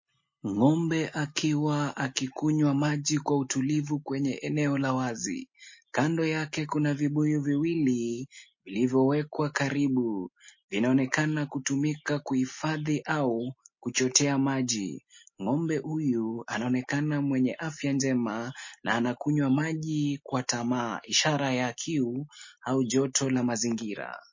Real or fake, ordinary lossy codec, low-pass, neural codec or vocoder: real; MP3, 32 kbps; 7.2 kHz; none